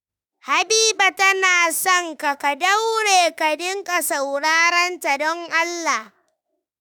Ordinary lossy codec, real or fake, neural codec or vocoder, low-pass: none; fake; autoencoder, 48 kHz, 32 numbers a frame, DAC-VAE, trained on Japanese speech; none